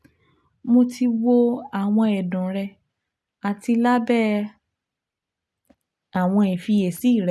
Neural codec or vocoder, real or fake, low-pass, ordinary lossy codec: none; real; none; none